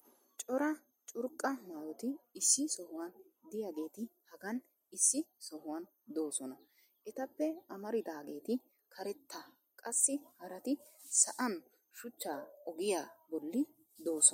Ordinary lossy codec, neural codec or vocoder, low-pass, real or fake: MP3, 64 kbps; none; 19.8 kHz; real